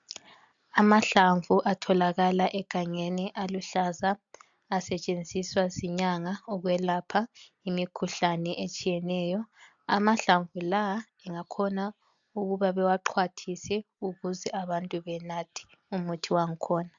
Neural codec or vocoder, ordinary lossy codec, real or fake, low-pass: none; MP3, 64 kbps; real; 7.2 kHz